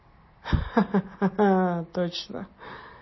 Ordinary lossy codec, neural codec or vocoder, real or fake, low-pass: MP3, 24 kbps; none; real; 7.2 kHz